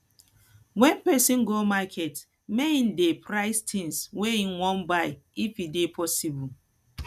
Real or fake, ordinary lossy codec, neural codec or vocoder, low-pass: real; none; none; 14.4 kHz